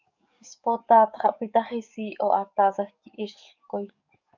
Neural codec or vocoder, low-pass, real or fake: codec, 44.1 kHz, 7.8 kbps, DAC; 7.2 kHz; fake